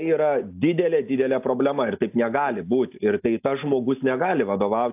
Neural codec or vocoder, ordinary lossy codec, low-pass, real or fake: none; AAC, 32 kbps; 3.6 kHz; real